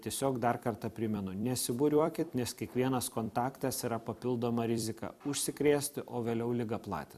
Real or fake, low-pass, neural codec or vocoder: fake; 14.4 kHz; vocoder, 44.1 kHz, 128 mel bands every 256 samples, BigVGAN v2